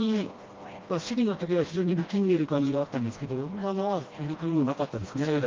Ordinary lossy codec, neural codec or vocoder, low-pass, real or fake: Opus, 32 kbps; codec, 16 kHz, 1 kbps, FreqCodec, smaller model; 7.2 kHz; fake